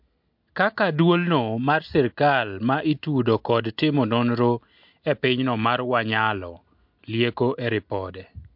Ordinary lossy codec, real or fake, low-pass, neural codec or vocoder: MP3, 48 kbps; real; 5.4 kHz; none